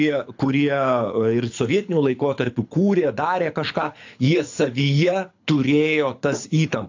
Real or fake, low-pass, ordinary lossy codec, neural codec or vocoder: fake; 7.2 kHz; AAC, 48 kbps; codec, 24 kHz, 6 kbps, HILCodec